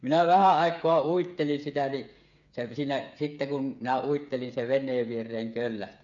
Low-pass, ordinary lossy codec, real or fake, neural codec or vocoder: 7.2 kHz; none; fake; codec, 16 kHz, 8 kbps, FreqCodec, smaller model